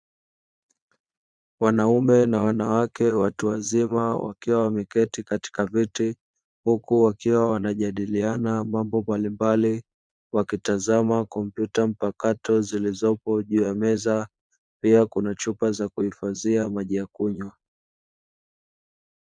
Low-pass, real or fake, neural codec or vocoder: 9.9 kHz; fake; vocoder, 22.05 kHz, 80 mel bands, Vocos